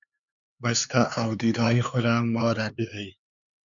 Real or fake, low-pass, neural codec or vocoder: fake; 7.2 kHz; codec, 16 kHz, 4 kbps, X-Codec, HuBERT features, trained on LibriSpeech